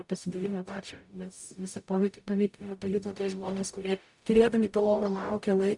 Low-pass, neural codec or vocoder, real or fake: 10.8 kHz; codec, 44.1 kHz, 0.9 kbps, DAC; fake